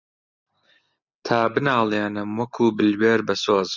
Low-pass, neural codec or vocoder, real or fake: 7.2 kHz; none; real